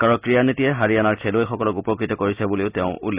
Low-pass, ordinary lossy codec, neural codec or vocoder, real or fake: 3.6 kHz; Opus, 64 kbps; none; real